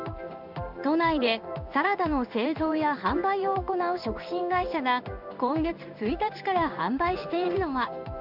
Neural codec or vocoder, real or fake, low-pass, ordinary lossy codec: codec, 16 kHz in and 24 kHz out, 1 kbps, XY-Tokenizer; fake; 5.4 kHz; none